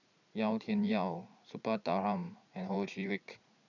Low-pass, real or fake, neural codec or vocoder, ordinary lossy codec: 7.2 kHz; fake; vocoder, 44.1 kHz, 80 mel bands, Vocos; none